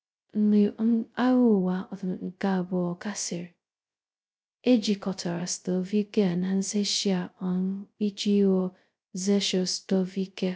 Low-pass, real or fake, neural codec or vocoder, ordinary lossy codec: none; fake; codec, 16 kHz, 0.2 kbps, FocalCodec; none